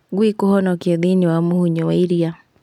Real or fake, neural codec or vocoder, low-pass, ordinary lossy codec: real; none; 19.8 kHz; none